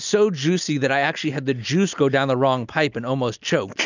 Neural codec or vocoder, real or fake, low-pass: none; real; 7.2 kHz